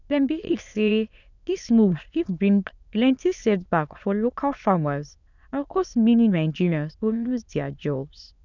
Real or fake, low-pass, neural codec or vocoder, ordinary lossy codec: fake; 7.2 kHz; autoencoder, 22.05 kHz, a latent of 192 numbers a frame, VITS, trained on many speakers; none